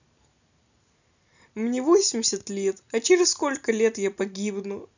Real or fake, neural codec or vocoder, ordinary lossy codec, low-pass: real; none; none; 7.2 kHz